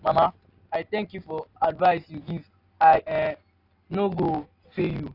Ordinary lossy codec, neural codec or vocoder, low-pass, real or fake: AAC, 32 kbps; none; 5.4 kHz; real